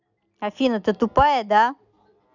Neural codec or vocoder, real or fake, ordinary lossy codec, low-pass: none; real; none; 7.2 kHz